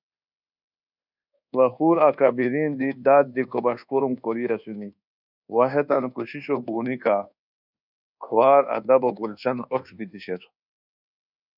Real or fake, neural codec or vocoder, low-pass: fake; codec, 24 kHz, 1.2 kbps, DualCodec; 5.4 kHz